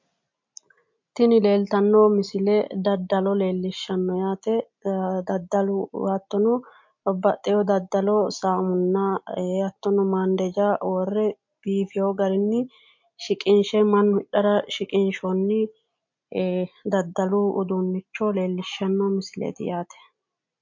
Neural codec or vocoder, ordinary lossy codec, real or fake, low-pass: none; MP3, 48 kbps; real; 7.2 kHz